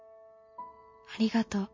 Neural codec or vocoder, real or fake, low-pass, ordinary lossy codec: none; real; 7.2 kHz; MP3, 32 kbps